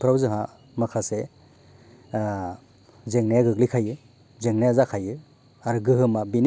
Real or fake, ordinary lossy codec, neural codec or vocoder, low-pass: real; none; none; none